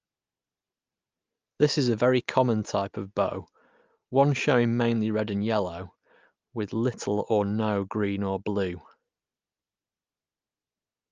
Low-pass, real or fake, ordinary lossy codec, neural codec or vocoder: 7.2 kHz; real; Opus, 24 kbps; none